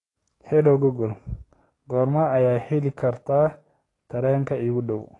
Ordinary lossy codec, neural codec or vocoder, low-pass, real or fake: AAC, 32 kbps; codec, 44.1 kHz, 7.8 kbps, DAC; 10.8 kHz; fake